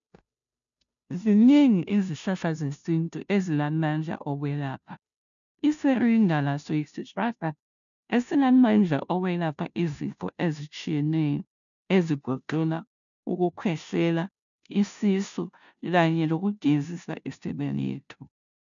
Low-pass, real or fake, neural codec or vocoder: 7.2 kHz; fake; codec, 16 kHz, 0.5 kbps, FunCodec, trained on Chinese and English, 25 frames a second